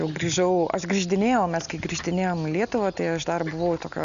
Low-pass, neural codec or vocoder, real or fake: 7.2 kHz; none; real